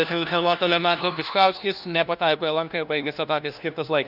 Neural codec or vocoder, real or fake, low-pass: codec, 16 kHz, 1 kbps, FunCodec, trained on LibriTTS, 50 frames a second; fake; 5.4 kHz